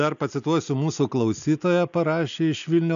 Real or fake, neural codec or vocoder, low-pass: real; none; 7.2 kHz